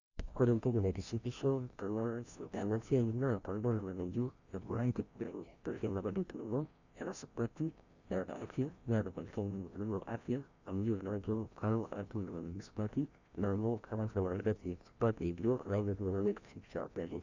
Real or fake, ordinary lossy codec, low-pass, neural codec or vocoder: fake; Opus, 64 kbps; 7.2 kHz; codec, 16 kHz, 1 kbps, FreqCodec, larger model